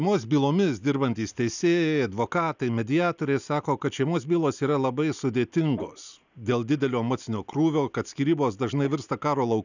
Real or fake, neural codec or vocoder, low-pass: real; none; 7.2 kHz